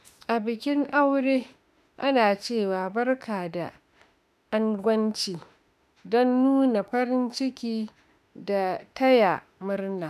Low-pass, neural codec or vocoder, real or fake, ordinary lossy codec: 14.4 kHz; autoencoder, 48 kHz, 32 numbers a frame, DAC-VAE, trained on Japanese speech; fake; none